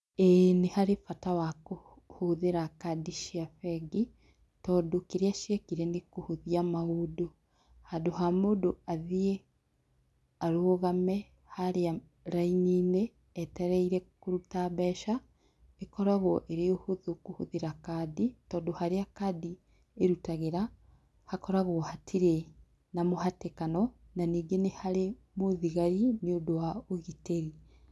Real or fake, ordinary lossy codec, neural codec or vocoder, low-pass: real; none; none; none